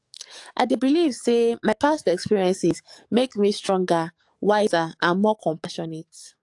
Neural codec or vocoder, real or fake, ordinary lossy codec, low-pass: codec, 44.1 kHz, 7.8 kbps, DAC; fake; AAC, 64 kbps; 10.8 kHz